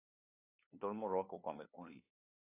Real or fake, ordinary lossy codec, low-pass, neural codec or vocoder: fake; AAC, 24 kbps; 3.6 kHz; codec, 16 kHz, 2 kbps, FunCodec, trained on LibriTTS, 25 frames a second